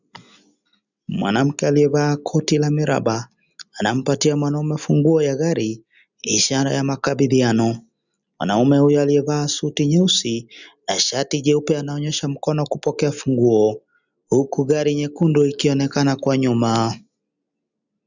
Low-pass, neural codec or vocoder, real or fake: 7.2 kHz; none; real